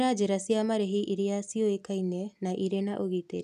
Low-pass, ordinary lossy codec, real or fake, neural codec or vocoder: 14.4 kHz; none; real; none